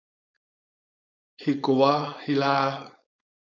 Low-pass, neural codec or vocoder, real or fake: 7.2 kHz; codec, 16 kHz, 4.8 kbps, FACodec; fake